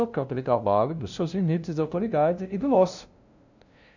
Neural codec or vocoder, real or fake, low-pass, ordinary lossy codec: codec, 16 kHz, 0.5 kbps, FunCodec, trained on LibriTTS, 25 frames a second; fake; 7.2 kHz; none